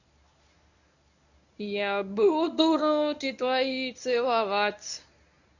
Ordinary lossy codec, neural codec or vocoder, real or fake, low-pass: none; codec, 24 kHz, 0.9 kbps, WavTokenizer, medium speech release version 1; fake; 7.2 kHz